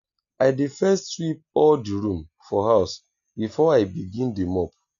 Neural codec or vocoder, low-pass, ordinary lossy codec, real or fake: none; 7.2 kHz; none; real